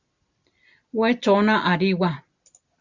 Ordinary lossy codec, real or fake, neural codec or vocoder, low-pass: Opus, 64 kbps; real; none; 7.2 kHz